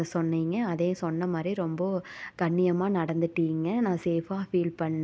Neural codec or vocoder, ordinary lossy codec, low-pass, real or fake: none; none; none; real